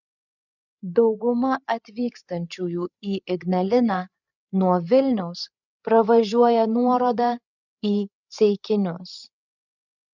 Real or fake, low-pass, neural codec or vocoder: fake; 7.2 kHz; codec, 16 kHz, 8 kbps, FreqCodec, larger model